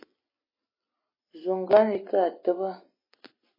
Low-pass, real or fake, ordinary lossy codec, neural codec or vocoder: 5.4 kHz; real; MP3, 24 kbps; none